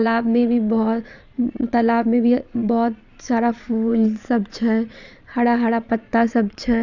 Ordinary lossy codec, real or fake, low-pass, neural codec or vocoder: none; fake; 7.2 kHz; vocoder, 22.05 kHz, 80 mel bands, Vocos